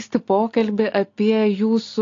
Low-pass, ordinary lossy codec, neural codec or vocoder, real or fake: 7.2 kHz; AAC, 48 kbps; none; real